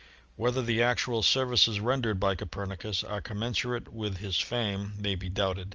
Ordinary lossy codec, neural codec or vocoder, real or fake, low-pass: Opus, 24 kbps; none; real; 7.2 kHz